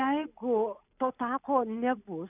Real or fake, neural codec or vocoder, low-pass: real; none; 3.6 kHz